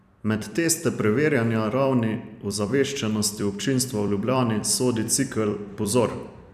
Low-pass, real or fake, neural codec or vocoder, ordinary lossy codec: 14.4 kHz; fake; vocoder, 44.1 kHz, 128 mel bands every 256 samples, BigVGAN v2; none